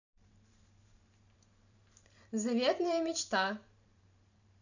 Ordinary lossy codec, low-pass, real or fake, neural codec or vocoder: none; 7.2 kHz; fake; vocoder, 44.1 kHz, 128 mel bands every 512 samples, BigVGAN v2